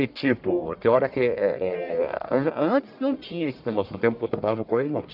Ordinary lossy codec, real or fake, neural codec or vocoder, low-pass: none; fake; codec, 44.1 kHz, 1.7 kbps, Pupu-Codec; 5.4 kHz